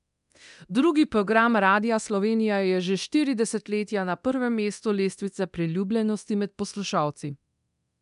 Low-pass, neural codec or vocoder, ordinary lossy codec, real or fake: 10.8 kHz; codec, 24 kHz, 0.9 kbps, DualCodec; none; fake